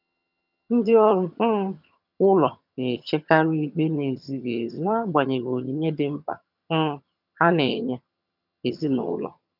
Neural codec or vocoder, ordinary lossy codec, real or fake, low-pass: vocoder, 22.05 kHz, 80 mel bands, HiFi-GAN; none; fake; 5.4 kHz